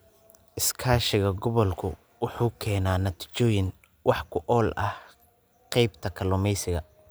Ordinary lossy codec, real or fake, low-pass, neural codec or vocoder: none; real; none; none